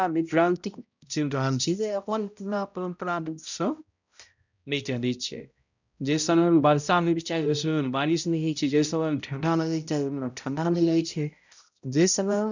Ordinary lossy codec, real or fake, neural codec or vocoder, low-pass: none; fake; codec, 16 kHz, 0.5 kbps, X-Codec, HuBERT features, trained on balanced general audio; 7.2 kHz